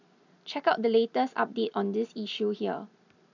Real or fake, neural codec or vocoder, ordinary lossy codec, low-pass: real; none; none; 7.2 kHz